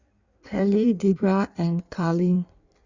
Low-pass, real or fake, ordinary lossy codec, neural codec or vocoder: 7.2 kHz; fake; Opus, 64 kbps; codec, 16 kHz in and 24 kHz out, 1.1 kbps, FireRedTTS-2 codec